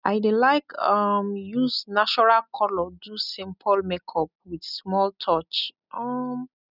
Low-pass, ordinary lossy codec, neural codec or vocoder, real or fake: 5.4 kHz; none; none; real